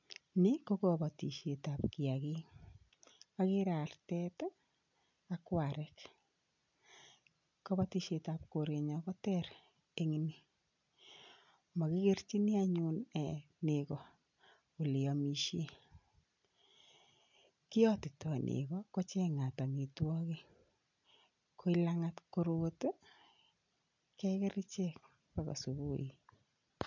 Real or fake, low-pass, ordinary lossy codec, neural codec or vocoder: real; 7.2 kHz; none; none